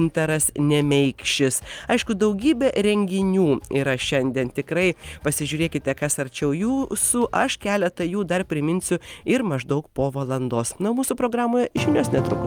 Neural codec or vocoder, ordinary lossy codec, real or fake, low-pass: none; Opus, 32 kbps; real; 19.8 kHz